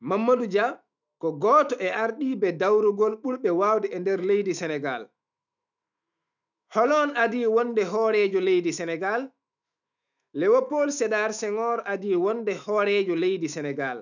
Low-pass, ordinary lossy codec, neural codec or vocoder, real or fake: 7.2 kHz; none; autoencoder, 48 kHz, 128 numbers a frame, DAC-VAE, trained on Japanese speech; fake